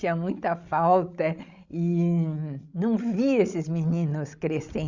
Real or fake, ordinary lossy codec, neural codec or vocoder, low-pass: fake; Opus, 64 kbps; codec, 16 kHz, 16 kbps, FreqCodec, larger model; 7.2 kHz